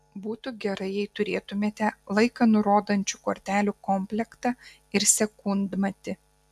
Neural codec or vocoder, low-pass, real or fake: none; 14.4 kHz; real